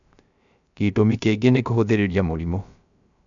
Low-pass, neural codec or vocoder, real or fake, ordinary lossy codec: 7.2 kHz; codec, 16 kHz, 0.3 kbps, FocalCodec; fake; none